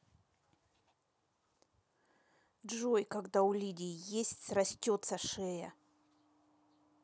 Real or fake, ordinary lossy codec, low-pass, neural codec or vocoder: real; none; none; none